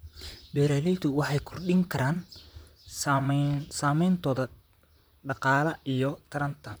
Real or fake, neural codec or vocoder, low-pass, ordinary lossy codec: fake; vocoder, 44.1 kHz, 128 mel bands, Pupu-Vocoder; none; none